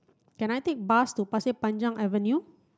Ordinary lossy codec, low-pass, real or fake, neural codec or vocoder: none; none; real; none